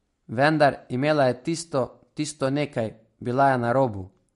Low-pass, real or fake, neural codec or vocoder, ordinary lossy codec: 10.8 kHz; real; none; MP3, 48 kbps